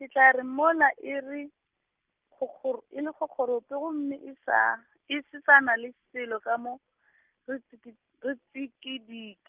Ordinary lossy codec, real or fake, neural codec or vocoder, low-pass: Opus, 24 kbps; real; none; 3.6 kHz